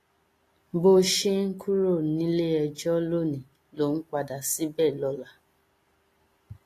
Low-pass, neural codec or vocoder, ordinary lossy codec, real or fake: 14.4 kHz; none; AAC, 48 kbps; real